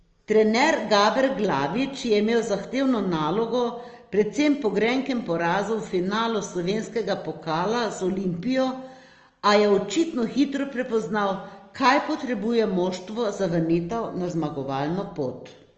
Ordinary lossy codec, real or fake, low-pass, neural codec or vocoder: Opus, 24 kbps; real; 7.2 kHz; none